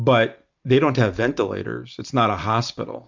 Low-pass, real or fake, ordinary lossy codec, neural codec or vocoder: 7.2 kHz; real; MP3, 64 kbps; none